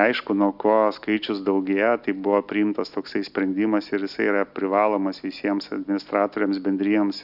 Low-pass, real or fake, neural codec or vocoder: 5.4 kHz; real; none